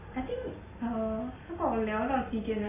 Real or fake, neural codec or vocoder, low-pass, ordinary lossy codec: real; none; 3.6 kHz; MP3, 16 kbps